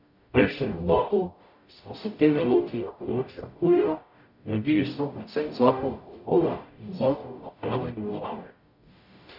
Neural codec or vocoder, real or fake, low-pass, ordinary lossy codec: codec, 44.1 kHz, 0.9 kbps, DAC; fake; 5.4 kHz; none